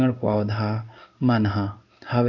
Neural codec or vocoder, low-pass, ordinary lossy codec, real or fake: codec, 16 kHz in and 24 kHz out, 1 kbps, XY-Tokenizer; 7.2 kHz; none; fake